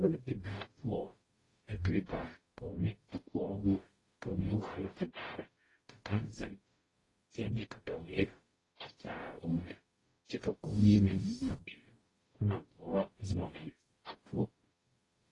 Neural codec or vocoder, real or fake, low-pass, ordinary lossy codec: codec, 44.1 kHz, 0.9 kbps, DAC; fake; 10.8 kHz; AAC, 32 kbps